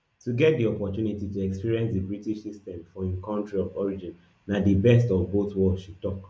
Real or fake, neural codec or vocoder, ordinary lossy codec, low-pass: real; none; none; none